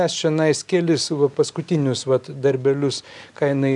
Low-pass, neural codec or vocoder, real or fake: 10.8 kHz; none; real